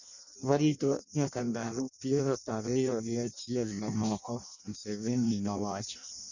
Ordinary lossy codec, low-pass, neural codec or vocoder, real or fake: none; 7.2 kHz; codec, 16 kHz in and 24 kHz out, 0.6 kbps, FireRedTTS-2 codec; fake